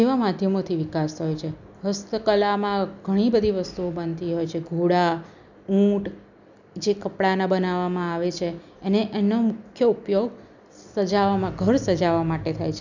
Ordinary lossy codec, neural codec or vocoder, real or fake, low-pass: none; none; real; 7.2 kHz